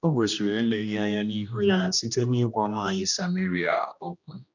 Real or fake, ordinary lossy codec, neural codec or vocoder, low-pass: fake; none; codec, 16 kHz, 1 kbps, X-Codec, HuBERT features, trained on general audio; 7.2 kHz